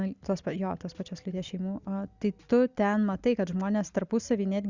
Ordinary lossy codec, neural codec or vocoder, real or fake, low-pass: Opus, 64 kbps; none; real; 7.2 kHz